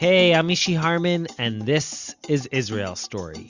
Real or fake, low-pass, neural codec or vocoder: real; 7.2 kHz; none